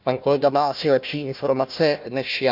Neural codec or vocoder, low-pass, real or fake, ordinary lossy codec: codec, 16 kHz, 1 kbps, FunCodec, trained on Chinese and English, 50 frames a second; 5.4 kHz; fake; none